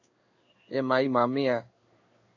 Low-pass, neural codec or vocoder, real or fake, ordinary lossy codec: 7.2 kHz; codec, 16 kHz in and 24 kHz out, 1 kbps, XY-Tokenizer; fake; AAC, 48 kbps